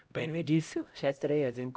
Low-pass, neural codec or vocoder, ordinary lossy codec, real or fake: none; codec, 16 kHz, 0.5 kbps, X-Codec, HuBERT features, trained on LibriSpeech; none; fake